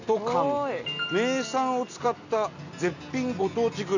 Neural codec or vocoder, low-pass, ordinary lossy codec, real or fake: none; 7.2 kHz; none; real